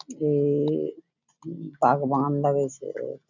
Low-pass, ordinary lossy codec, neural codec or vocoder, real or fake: 7.2 kHz; none; none; real